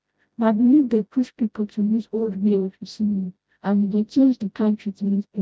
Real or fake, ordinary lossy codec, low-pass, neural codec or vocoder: fake; none; none; codec, 16 kHz, 0.5 kbps, FreqCodec, smaller model